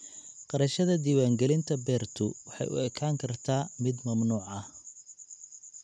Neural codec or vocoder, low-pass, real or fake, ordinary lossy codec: none; none; real; none